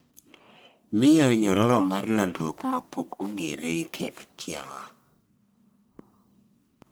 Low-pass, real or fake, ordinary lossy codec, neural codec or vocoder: none; fake; none; codec, 44.1 kHz, 1.7 kbps, Pupu-Codec